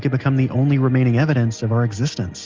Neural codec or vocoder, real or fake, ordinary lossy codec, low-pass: none; real; Opus, 32 kbps; 7.2 kHz